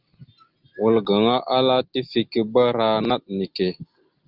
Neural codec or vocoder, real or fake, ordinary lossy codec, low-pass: none; real; Opus, 24 kbps; 5.4 kHz